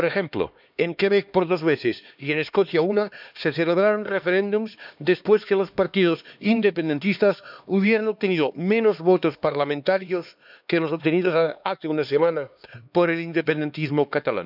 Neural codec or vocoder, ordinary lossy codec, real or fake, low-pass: codec, 16 kHz, 2 kbps, X-Codec, HuBERT features, trained on LibriSpeech; none; fake; 5.4 kHz